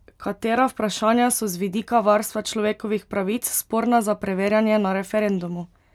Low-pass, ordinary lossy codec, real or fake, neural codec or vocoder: 19.8 kHz; none; real; none